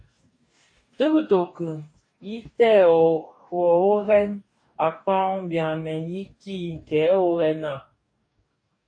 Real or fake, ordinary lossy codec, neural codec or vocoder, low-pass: fake; AAC, 48 kbps; codec, 44.1 kHz, 2.6 kbps, DAC; 9.9 kHz